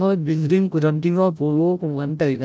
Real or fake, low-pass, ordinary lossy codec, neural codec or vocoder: fake; none; none; codec, 16 kHz, 0.5 kbps, FreqCodec, larger model